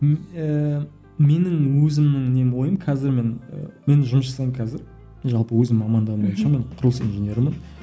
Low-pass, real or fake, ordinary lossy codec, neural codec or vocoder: none; real; none; none